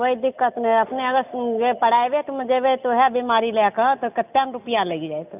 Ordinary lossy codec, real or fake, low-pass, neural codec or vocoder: none; real; 3.6 kHz; none